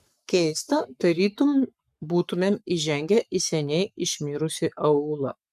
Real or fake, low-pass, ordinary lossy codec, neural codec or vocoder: fake; 14.4 kHz; MP3, 96 kbps; codec, 44.1 kHz, 7.8 kbps, Pupu-Codec